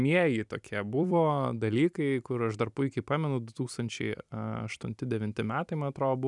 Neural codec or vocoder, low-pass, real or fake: vocoder, 44.1 kHz, 128 mel bands every 256 samples, BigVGAN v2; 10.8 kHz; fake